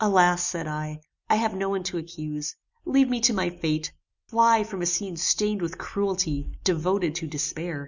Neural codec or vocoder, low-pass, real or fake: none; 7.2 kHz; real